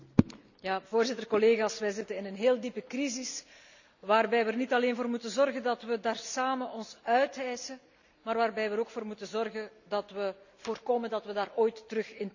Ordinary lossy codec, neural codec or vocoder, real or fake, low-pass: none; none; real; 7.2 kHz